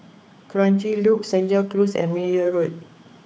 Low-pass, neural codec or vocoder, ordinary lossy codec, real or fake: none; codec, 16 kHz, 4 kbps, X-Codec, HuBERT features, trained on general audio; none; fake